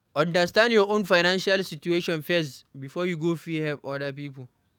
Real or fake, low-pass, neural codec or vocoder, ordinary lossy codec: fake; 19.8 kHz; codec, 44.1 kHz, 7.8 kbps, DAC; none